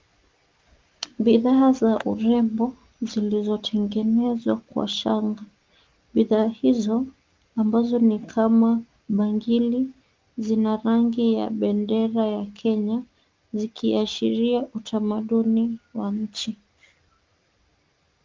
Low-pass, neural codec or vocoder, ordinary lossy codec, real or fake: 7.2 kHz; none; Opus, 24 kbps; real